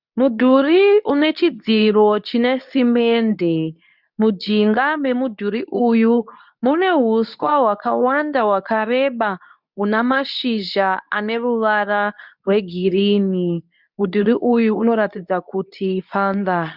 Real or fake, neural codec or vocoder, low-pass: fake; codec, 24 kHz, 0.9 kbps, WavTokenizer, medium speech release version 2; 5.4 kHz